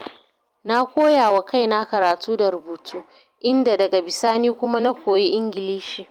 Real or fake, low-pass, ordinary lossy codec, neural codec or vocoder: fake; 19.8 kHz; Opus, 32 kbps; vocoder, 44.1 kHz, 128 mel bands every 256 samples, BigVGAN v2